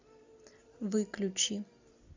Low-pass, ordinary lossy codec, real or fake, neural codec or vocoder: 7.2 kHz; AAC, 48 kbps; real; none